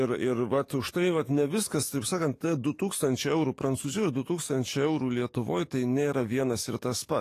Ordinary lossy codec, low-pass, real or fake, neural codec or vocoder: AAC, 48 kbps; 14.4 kHz; fake; codec, 44.1 kHz, 7.8 kbps, DAC